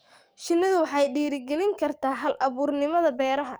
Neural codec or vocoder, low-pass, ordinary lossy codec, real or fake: codec, 44.1 kHz, 7.8 kbps, DAC; none; none; fake